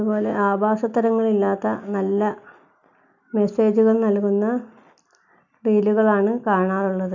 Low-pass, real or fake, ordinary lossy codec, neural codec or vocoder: 7.2 kHz; real; none; none